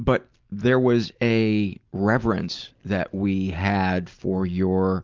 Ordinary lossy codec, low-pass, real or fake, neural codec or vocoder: Opus, 24 kbps; 7.2 kHz; real; none